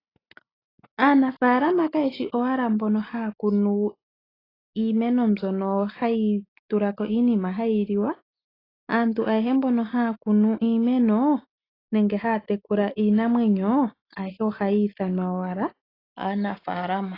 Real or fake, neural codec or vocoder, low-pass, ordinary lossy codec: real; none; 5.4 kHz; AAC, 24 kbps